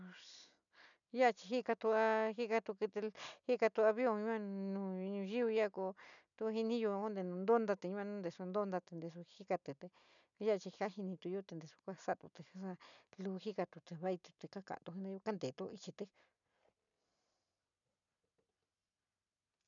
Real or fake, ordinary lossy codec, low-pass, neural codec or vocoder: real; none; 7.2 kHz; none